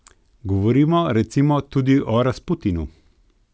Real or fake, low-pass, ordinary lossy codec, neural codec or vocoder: real; none; none; none